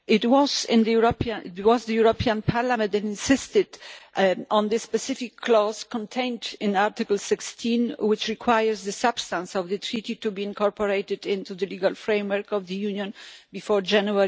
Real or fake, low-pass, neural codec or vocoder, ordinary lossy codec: real; none; none; none